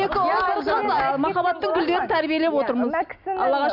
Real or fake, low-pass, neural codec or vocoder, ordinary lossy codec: real; 5.4 kHz; none; none